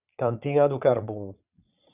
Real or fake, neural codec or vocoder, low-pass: fake; vocoder, 22.05 kHz, 80 mel bands, Vocos; 3.6 kHz